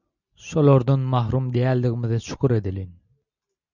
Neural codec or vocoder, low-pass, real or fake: none; 7.2 kHz; real